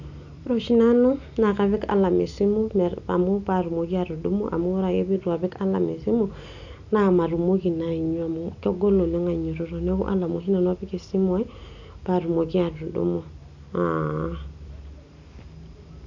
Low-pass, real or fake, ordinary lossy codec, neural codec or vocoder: 7.2 kHz; real; none; none